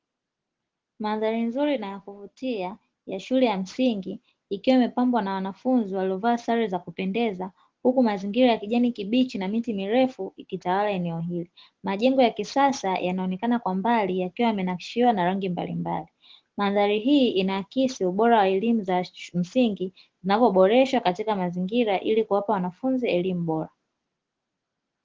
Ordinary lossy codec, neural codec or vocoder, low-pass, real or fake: Opus, 16 kbps; none; 7.2 kHz; real